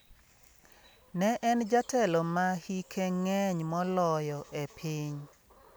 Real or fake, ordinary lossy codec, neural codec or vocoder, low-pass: real; none; none; none